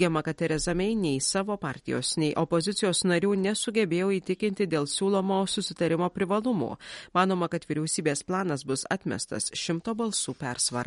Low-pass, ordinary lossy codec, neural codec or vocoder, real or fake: 19.8 kHz; MP3, 48 kbps; none; real